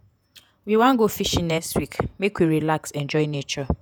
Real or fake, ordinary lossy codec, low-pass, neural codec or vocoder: fake; none; none; vocoder, 48 kHz, 128 mel bands, Vocos